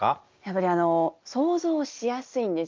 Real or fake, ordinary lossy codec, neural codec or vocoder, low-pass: real; Opus, 24 kbps; none; 7.2 kHz